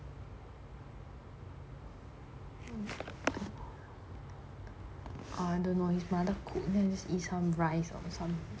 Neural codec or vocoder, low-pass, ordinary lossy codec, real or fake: none; none; none; real